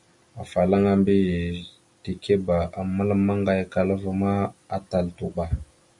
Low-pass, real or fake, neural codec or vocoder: 10.8 kHz; real; none